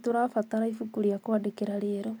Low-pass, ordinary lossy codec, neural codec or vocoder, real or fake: none; none; vocoder, 44.1 kHz, 128 mel bands every 256 samples, BigVGAN v2; fake